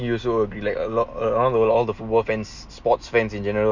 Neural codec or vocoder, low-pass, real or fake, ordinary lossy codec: none; 7.2 kHz; real; none